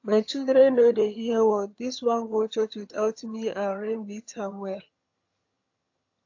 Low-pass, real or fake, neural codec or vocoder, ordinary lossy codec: 7.2 kHz; fake; vocoder, 22.05 kHz, 80 mel bands, HiFi-GAN; AAC, 48 kbps